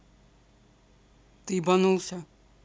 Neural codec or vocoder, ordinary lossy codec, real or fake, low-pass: none; none; real; none